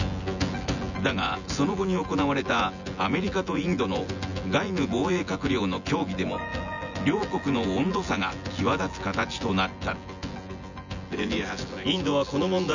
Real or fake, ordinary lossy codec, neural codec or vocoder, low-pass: fake; none; vocoder, 24 kHz, 100 mel bands, Vocos; 7.2 kHz